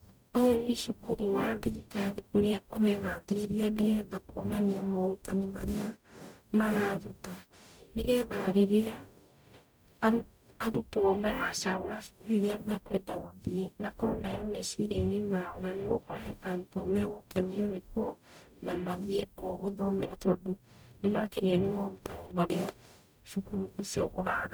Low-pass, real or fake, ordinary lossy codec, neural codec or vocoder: none; fake; none; codec, 44.1 kHz, 0.9 kbps, DAC